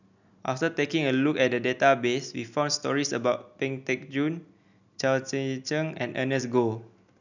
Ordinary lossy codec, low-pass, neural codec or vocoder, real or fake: none; 7.2 kHz; none; real